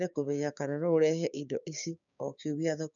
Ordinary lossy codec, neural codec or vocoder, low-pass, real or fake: none; codec, 16 kHz, 6 kbps, DAC; 7.2 kHz; fake